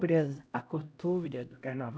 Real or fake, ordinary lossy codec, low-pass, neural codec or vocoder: fake; none; none; codec, 16 kHz, 0.5 kbps, X-Codec, HuBERT features, trained on LibriSpeech